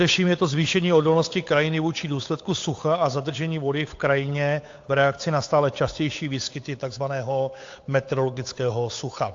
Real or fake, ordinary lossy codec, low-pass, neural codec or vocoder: fake; AAC, 48 kbps; 7.2 kHz; codec, 16 kHz, 8 kbps, FunCodec, trained on Chinese and English, 25 frames a second